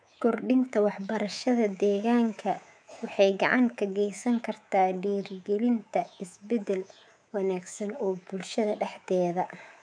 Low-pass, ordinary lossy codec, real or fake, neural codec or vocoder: 9.9 kHz; none; fake; codec, 24 kHz, 3.1 kbps, DualCodec